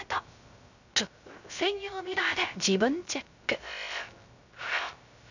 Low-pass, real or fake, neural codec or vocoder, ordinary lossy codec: 7.2 kHz; fake; codec, 16 kHz, 0.3 kbps, FocalCodec; none